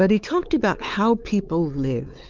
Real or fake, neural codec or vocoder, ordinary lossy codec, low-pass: fake; codec, 16 kHz, 4 kbps, X-Codec, WavLM features, trained on Multilingual LibriSpeech; Opus, 24 kbps; 7.2 kHz